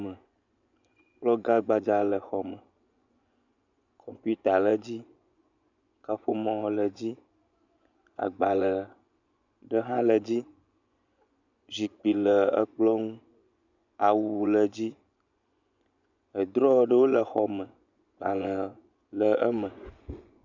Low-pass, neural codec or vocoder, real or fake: 7.2 kHz; vocoder, 24 kHz, 100 mel bands, Vocos; fake